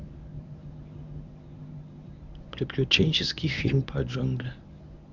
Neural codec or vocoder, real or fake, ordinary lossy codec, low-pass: codec, 24 kHz, 0.9 kbps, WavTokenizer, medium speech release version 1; fake; none; 7.2 kHz